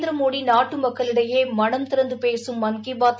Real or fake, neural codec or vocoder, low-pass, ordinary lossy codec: real; none; none; none